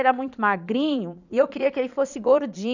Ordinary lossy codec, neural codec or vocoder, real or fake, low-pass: none; vocoder, 22.05 kHz, 80 mel bands, Vocos; fake; 7.2 kHz